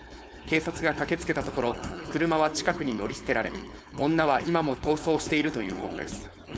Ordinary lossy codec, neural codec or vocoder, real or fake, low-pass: none; codec, 16 kHz, 4.8 kbps, FACodec; fake; none